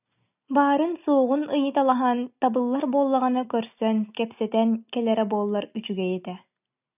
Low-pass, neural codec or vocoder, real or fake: 3.6 kHz; none; real